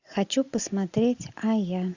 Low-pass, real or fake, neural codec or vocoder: 7.2 kHz; real; none